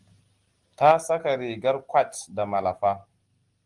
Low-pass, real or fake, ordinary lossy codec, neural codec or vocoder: 10.8 kHz; real; Opus, 24 kbps; none